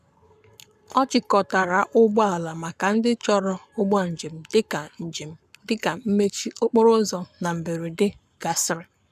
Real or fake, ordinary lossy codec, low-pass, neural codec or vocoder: fake; none; 14.4 kHz; codec, 44.1 kHz, 7.8 kbps, Pupu-Codec